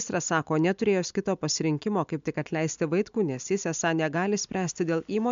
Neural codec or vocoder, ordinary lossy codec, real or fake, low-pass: none; MP3, 64 kbps; real; 7.2 kHz